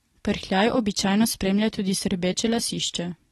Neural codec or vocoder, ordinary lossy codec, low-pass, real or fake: none; AAC, 32 kbps; 19.8 kHz; real